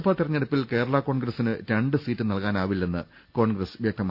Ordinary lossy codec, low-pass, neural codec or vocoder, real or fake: Opus, 64 kbps; 5.4 kHz; none; real